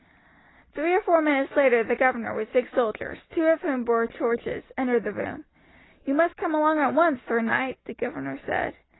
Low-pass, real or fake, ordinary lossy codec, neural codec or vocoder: 7.2 kHz; real; AAC, 16 kbps; none